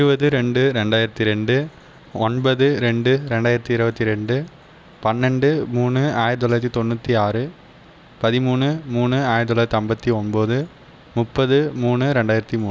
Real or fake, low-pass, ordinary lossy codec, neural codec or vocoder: real; none; none; none